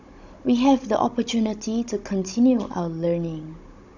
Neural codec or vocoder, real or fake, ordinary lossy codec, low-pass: codec, 16 kHz, 16 kbps, FunCodec, trained on Chinese and English, 50 frames a second; fake; none; 7.2 kHz